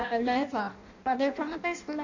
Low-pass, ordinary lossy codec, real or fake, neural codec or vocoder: 7.2 kHz; none; fake; codec, 16 kHz in and 24 kHz out, 0.6 kbps, FireRedTTS-2 codec